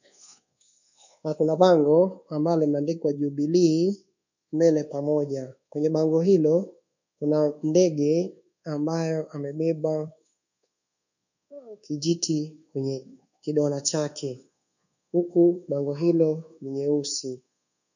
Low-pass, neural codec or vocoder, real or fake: 7.2 kHz; codec, 24 kHz, 1.2 kbps, DualCodec; fake